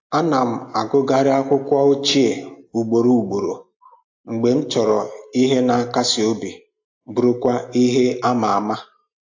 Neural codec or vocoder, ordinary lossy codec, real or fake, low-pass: none; AAC, 48 kbps; real; 7.2 kHz